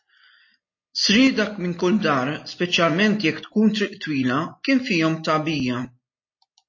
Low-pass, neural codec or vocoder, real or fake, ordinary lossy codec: 7.2 kHz; none; real; MP3, 32 kbps